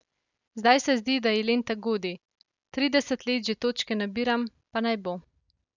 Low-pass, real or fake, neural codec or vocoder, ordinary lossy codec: 7.2 kHz; real; none; none